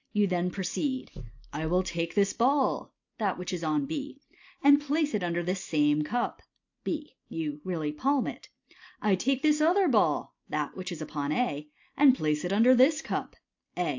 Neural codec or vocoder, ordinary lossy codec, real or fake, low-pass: none; AAC, 48 kbps; real; 7.2 kHz